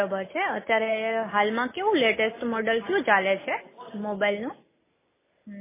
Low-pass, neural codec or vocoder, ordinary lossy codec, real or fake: 3.6 kHz; none; MP3, 16 kbps; real